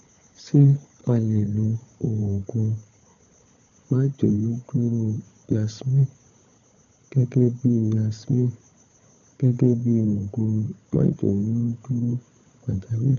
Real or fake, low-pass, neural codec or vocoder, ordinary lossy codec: fake; 7.2 kHz; codec, 16 kHz, 4 kbps, FunCodec, trained on Chinese and English, 50 frames a second; none